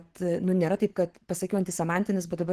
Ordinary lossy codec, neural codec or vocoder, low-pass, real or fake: Opus, 16 kbps; codec, 44.1 kHz, 7.8 kbps, Pupu-Codec; 14.4 kHz; fake